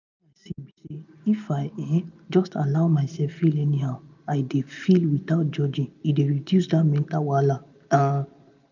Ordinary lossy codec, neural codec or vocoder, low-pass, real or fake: none; none; 7.2 kHz; real